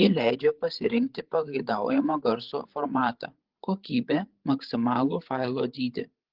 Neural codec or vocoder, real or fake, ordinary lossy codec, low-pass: codec, 16 kHz, 8 kbps, FreqCodec, larger model; fake; Opus, 16 kbps; 5.4 kHz